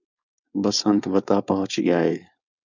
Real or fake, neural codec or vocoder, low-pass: fake; codec, 16 kHz, 4.8 kbps, FACodec; 7.2 kHz